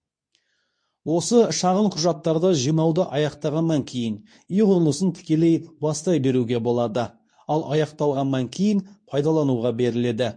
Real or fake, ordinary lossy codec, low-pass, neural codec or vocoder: fake; MP3, 48 kbps; 9.9 kHz; codec, 24 kHz, 0.9 kbps, WavTokenizer, medium speech release version 2